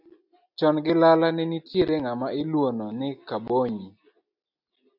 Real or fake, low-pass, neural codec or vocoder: real; 5.4 kHz; none